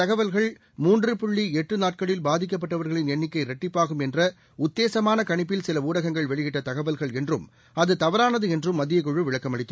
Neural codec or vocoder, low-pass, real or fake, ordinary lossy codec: none; none; real; none